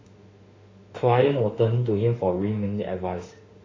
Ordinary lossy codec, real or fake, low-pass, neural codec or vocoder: AAC, 32 kbps; fake; 7.2 kHz; autoencoder, 48 kHz, 32 numbers a frame, DAC-VAE, trained on Japanese speech